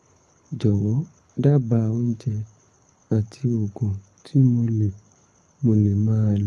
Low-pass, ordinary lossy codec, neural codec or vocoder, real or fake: none; none; codec, 24 kHz, 6 kbps, HILCodec; fake